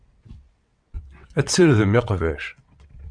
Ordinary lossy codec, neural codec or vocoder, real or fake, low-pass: MP3, 64 kbps; vocoder, 22.05 kHz, 80 mel bands, WaveNeXt; fake; 9.9 kHz